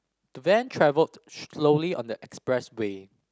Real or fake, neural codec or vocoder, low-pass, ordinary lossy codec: real; none; none; none